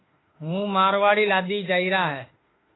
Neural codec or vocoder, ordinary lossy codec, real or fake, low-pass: autoencoder, 48 kHz, 32 numbers a frame, DAC-VAE, trained on Japanese speech; AAC, 16 kbps; fake; 7.2 kHz